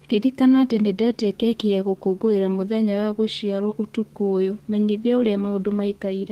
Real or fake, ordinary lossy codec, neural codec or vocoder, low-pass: fake; Opus, 24 kbps; codec, 32 kHz, 1.9 kbps, SNAC; 14.4 kHz